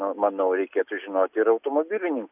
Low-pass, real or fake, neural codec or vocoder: 3.6 kHz; real; none